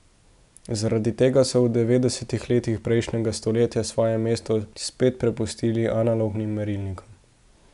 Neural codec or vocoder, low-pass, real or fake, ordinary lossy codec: none; 10.8 kHz; real; none